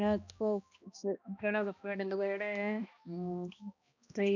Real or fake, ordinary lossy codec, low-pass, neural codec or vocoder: fake; none; 7.2 kHz; codec, 16 kHz, 1 kbps, X-Codec, HuBERT features, trained on balanced general audio